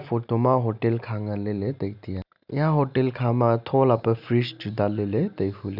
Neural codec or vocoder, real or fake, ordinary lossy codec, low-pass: none; real; none; 5.4 kHz